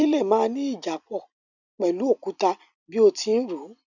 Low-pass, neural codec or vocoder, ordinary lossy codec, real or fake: 7.2 kHz; vocoder, 44.1 kHz, 128 mel bands every 256 samples, BigVGAN v2; none; fake